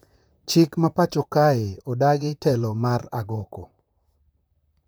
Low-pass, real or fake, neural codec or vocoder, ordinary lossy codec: none; fake; vocoder, 44.1 kHz, 128 mel bands, Pupu-Vocoder; none